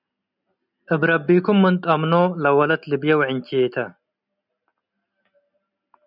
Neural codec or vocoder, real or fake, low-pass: none; real; 5.4 kHz